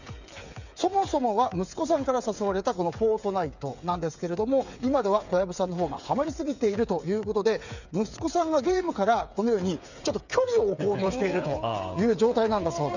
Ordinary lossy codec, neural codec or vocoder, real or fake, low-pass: none; codec, 16 kHz, 8 kbps, FreqCodec, smaller model; fake; 7.2 kHz